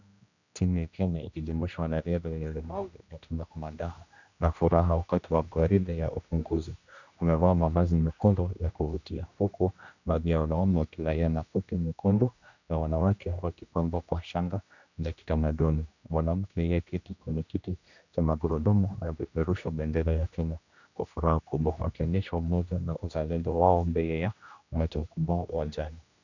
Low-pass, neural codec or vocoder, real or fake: 7.2 kHz; codec, 16 kHz, 1 kbps, X-Codec, HuBERT features, trained on general audio; fake